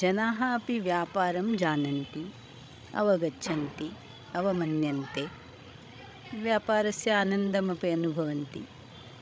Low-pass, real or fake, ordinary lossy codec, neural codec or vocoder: none; fake; none; codec, 16 kHz, 16 kbps, FreqCodec, larger model